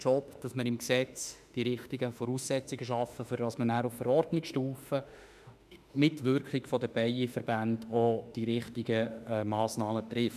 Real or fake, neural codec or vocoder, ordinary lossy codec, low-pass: fake; autoencoder, 48 kHz, 32 numbers a frame, DAC-VAE, trained on Japanese speech; none; 14.4 kHz